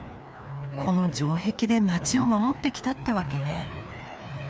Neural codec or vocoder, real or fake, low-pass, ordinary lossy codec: codec, 16 kHz, 2 kbps, FreqCodec, larger model; fake; none; none